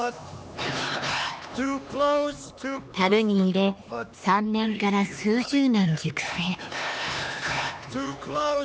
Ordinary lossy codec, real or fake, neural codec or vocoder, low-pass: none; fake; codec, 16 kHz, 2 kbps, X-Codec, HuBERT features, trained on LibriSpeech; none